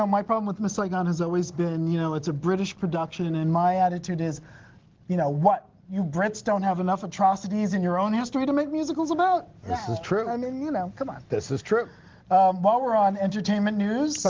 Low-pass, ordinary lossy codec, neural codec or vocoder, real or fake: 7.2 kHz; Opus, 16 kbps; none; real